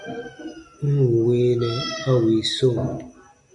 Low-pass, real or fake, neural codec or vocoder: 10.8 kHz; real; none